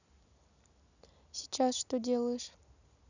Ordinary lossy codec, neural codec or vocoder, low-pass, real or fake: none; none; 7.2 kHz; real